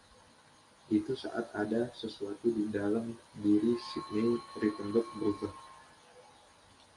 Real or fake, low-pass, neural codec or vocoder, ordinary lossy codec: real; 10.8 kHz; none; MP3, 64 kbps